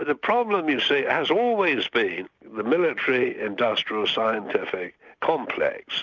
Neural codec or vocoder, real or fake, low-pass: none; real; 7.2 kHz